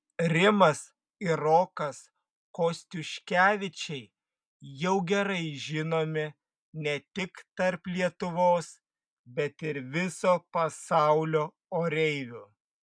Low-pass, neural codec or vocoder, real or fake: 9.9 kHz; none; real